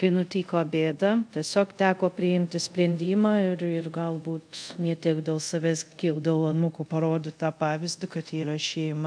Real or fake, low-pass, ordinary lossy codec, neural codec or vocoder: fake; 9.9 kHz; MP3, 96 kbps; codec, 24 kHz, 0.5 kbps, DualCodec